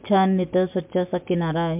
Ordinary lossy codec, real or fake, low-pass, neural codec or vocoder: MP3, 32 kbps; real; 3.6 kHz; none